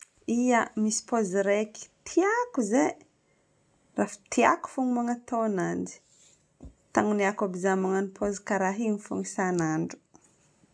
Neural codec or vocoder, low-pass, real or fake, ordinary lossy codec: none; none; real; none